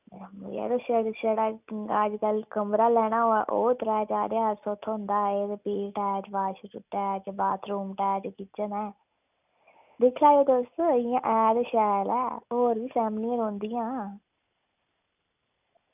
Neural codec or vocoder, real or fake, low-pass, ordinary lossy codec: none; real; 3.6 kHz; none